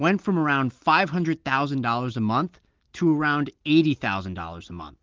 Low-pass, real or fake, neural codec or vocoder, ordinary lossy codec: 7.2 kHz; real; none; Opus, 16 kbps